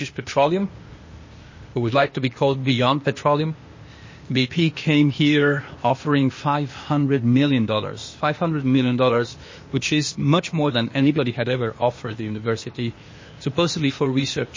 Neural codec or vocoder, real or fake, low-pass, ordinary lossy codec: codec, 16 kHz, 0.8 kbps, ZipCodec; fake; 7.2 kHz; MP3, 32 kbps